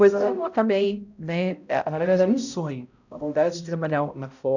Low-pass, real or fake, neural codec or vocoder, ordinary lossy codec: 7.2 kHz; fake; codec, 16 kHz, 0.5 kbps, X-Codec, HuBERT features, trained on balanced general audio; none